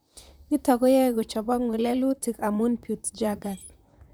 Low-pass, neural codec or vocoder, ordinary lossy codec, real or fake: none; vocoder, 44.1 kHz, 128 mel bands, Pupu-Vocoder; none; fake